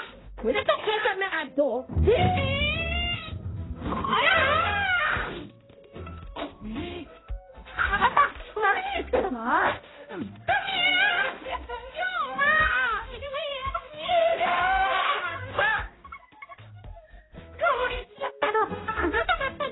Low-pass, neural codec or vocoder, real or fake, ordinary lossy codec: 7.2 kHz; codec, 16 kHz, 0.5 kbps, X-Codec, HuBERT features, trained on balanced general audio; fake; AAC, 16 kbps